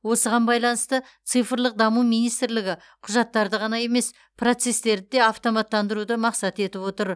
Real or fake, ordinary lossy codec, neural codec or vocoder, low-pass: real; none; none; none